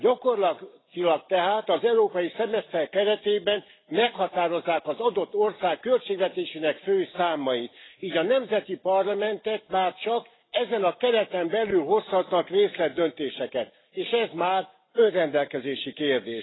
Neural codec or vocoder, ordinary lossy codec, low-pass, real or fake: none; AAC, 16 kbps; 7.2 kHz; real